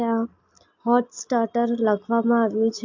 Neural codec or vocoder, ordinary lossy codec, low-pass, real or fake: none; AAC, 48 kbps; 7.2 kHz; real